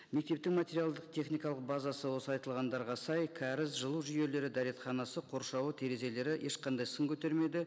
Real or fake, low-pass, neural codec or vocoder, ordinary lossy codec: real; none; none; none